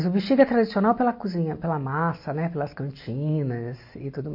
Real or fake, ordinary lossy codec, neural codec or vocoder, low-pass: real; MP3, 32 kbps; none; 5.4 kHz